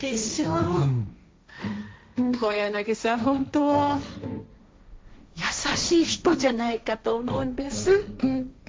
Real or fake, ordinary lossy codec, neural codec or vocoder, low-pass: fake; none; codec, 16 kHz, 1.1 kbps, Voila-Tokenizer; none